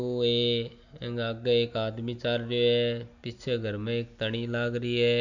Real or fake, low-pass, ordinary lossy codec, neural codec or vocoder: real; 7.2 kHz; none; none